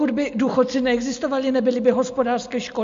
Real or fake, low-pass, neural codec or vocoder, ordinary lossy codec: real; 7.2 kHz; none; MP3, 48 kbps